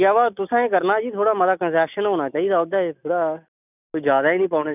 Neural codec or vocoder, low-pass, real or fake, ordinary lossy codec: none; 3.6 kHz; real; none